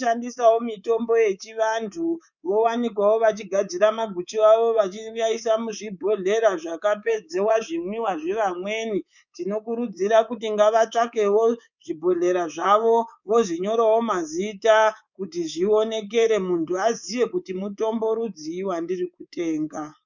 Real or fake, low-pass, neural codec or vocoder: fake; 7.2 kHz; codec, 24 kHz, 3.1 kbps, DualCodec